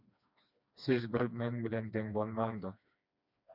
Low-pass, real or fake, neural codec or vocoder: 5.4 kHz; fake; codec, 16 kHz, 2 kbps, FreqCodec, smaller model